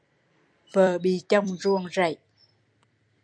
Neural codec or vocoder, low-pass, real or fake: vocoder, 44.1 kHz, 128 mel bands every 256 samples, BigVGAN v2; 9.9 kHz; fake